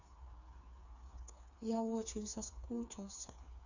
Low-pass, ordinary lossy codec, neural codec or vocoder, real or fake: 7.2 kHz; none; codec, 16 kHz, 4 kbps, FreqCodec, smaller model; fake